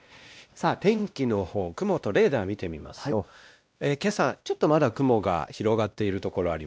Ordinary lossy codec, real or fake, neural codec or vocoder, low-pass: none; fake; codec, 16 kHz, 1 kbps, X-Codec, WavLM features, trained on Multilingual LibriSpeech; none